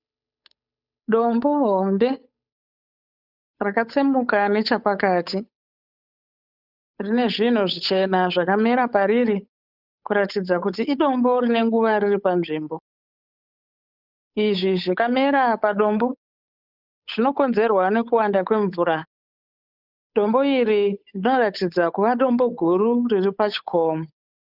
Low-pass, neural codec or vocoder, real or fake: 5.4 kHz; codec, 16 kHz, 8 kbps, FunCodec, trained on Chinese and English, 25 frames a second; fake